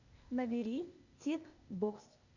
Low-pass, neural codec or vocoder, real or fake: 7.2 kHz; codec, 16 kHz, 0.8 kbps, ZipCodec; fake